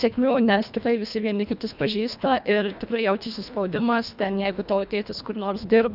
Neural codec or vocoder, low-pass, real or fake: codec, 24 kHz, 1.5 kbps, HILCodec; 5.4 kHz; fake